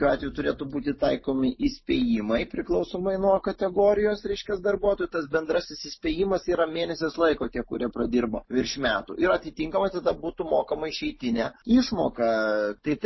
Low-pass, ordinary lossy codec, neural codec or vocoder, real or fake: 7.2 kHz; MP3, 24 kbps; none; real